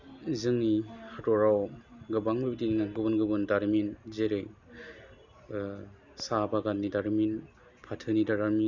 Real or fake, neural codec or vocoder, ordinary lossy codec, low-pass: real; none; none; 7.2 kHz